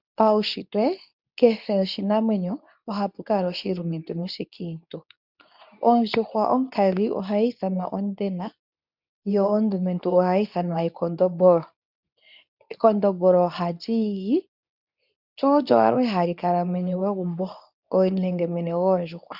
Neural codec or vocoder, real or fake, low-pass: codec, 24 kHz, 0.9 kbps, WavTokenizer, medium speech release version 2; fake; 5.4 kHz